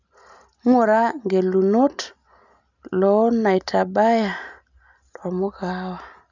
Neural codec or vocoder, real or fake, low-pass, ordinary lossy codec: none; real; 7.2 kHz; none